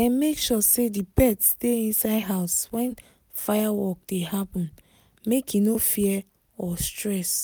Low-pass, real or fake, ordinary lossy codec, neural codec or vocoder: none; real; none; none